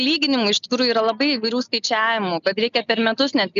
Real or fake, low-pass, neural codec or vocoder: real; 7.2 kHz; none